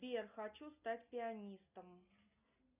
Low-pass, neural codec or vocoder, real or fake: 3.6 kHz; none; real